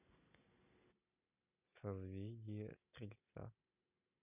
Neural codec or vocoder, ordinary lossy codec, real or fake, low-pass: none; none; real; 3.6 kHz